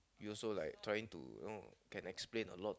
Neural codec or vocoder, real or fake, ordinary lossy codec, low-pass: none; real; none; none